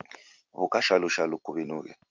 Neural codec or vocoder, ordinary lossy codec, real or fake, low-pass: none; Opus, 24 kbps; real; 7.2 kHz